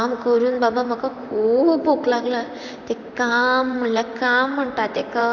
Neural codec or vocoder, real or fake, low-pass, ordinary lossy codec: vocoder, 44.1 kHz, 128 mel bands, Pupu-Vocoder; fake; 7.2 kHz; none